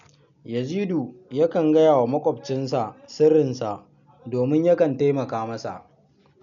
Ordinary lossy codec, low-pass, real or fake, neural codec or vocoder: none; 7.2 kHz; real; none